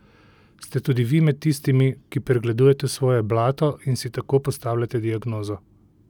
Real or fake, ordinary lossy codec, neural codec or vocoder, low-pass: real; none; none; 19.8 kHz